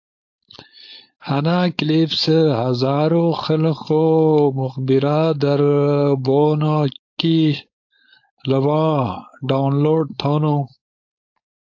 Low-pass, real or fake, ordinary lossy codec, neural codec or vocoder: 7.2 kHz; fake; AAC, 48 kbps; codec, 16 kHz, 4.8 kbps, FACodec